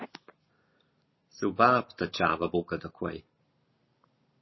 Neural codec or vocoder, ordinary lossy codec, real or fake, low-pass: none; MP3, 24 kbps; real; 7.2 kHz